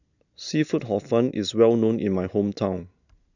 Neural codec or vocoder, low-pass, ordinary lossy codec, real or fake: none; 7.2 kHz; none; real